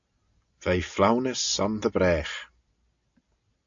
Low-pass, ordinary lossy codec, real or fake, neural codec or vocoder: 7.2 kHz; AAC, 64 kbps; real; none